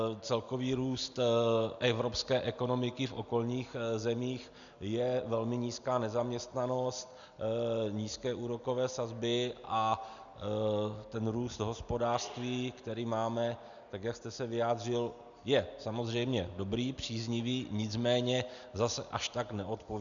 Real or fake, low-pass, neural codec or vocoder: real; 7.2 kHz; none